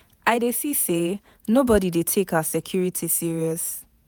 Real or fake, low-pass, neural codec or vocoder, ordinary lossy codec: fake; none; vocoder, 48 kHz, 128 mel bands, Vocos; none